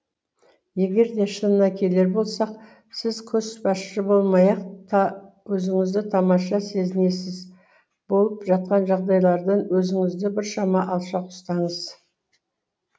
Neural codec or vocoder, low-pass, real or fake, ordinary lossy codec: none; none; real; none